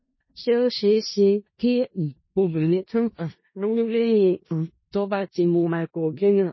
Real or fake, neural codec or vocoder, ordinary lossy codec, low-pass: fake; codec, 16 kHz in and 24 kHz out, 0.4 kbps, LongCat-Audio-Codec, four codebook decoder; MP3, 24 kbps; 7.2 kHz